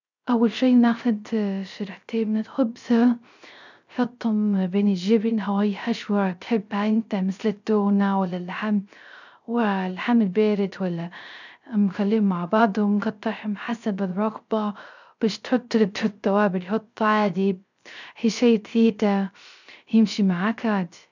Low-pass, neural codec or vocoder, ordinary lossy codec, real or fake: 7.2 kHz; codec, 16 kHz, 0.3 kbps, FocalCodec; none; fake